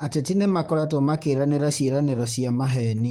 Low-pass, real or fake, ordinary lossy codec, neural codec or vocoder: 19.8 kHz; fake; Opus, 24 kbps; vocoder, 44.1 kHz, 128 mel bands, Pupu-Vocoder